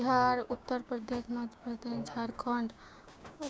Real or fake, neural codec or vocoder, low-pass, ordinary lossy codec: fake; codec, 16 kHz, 6 kbps, DAC; none; none